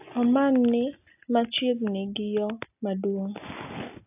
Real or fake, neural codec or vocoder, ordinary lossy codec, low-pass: real; none; AAC, 32 kbps; 3.6 kHz